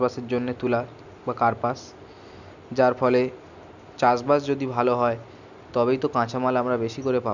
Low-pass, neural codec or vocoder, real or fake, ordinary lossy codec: 7.2 kHz; none; real; none